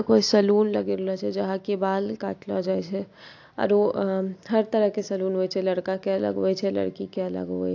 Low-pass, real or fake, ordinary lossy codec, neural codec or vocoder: 7.2 kHz; real; AAC, 48 kbps; none